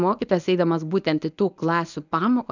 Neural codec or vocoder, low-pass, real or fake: codec, 24 kHz, 0.9 kbps, WavTokenizer, medium speech release version 1; 7.2 kHz; fake